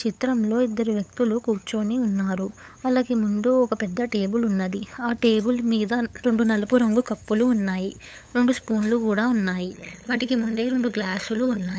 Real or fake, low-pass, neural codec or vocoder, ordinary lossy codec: fake; none; codec, 16 kHz, 8 kbps, FunCodec, trained on LibriTTS, 25 frames a second; none